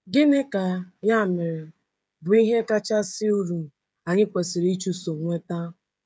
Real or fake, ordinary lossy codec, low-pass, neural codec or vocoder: fake; none; none; codec, 16 kHz, 16 kbps, FreqCodec, smaller model